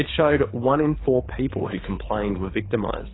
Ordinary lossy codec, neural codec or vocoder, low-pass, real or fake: AAC, 16 kbps; codec, 24 kHz, 6 kbps, HILCodec; 7.2 kHz; fake